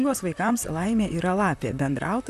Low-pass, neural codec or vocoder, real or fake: 14.4 kHz; vocoder, 44.1 kHz, 128 mel bands, Pupu-Vocoder; fake